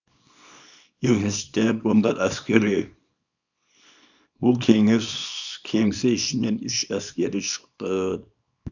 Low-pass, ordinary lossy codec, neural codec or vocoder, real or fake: 7.2 kHz; none; codec, 24 kHz, 0.9 kbps, WavTokenizer, small release; fake